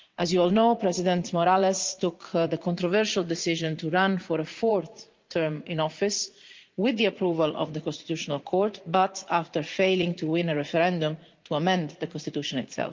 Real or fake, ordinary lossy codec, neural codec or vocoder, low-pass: fake; Opus, 24 kbps; vocoder, 44.1 kHz, 80 mel bands, Vocos; 7.2 kHz